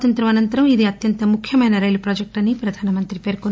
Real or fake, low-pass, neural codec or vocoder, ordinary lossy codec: real; none; none; none